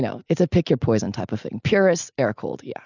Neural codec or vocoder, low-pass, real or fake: none; 7.2 kHz; real